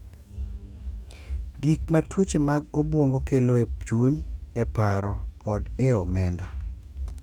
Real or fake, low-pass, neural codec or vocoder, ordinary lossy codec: fake; 19.8 kHz; codec, 44.1 kHz, 2.6 kbps, DAC; none